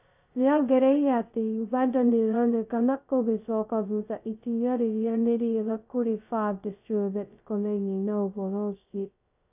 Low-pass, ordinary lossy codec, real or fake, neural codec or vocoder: 3.6 kHz; AAC, 32 kbps; fake; codec, 16 kHz, 0.2 kbps, FocalCodec